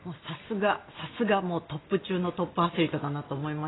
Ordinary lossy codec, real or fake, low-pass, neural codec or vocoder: AAC, 16 kbps; real; 7.2 kHz; none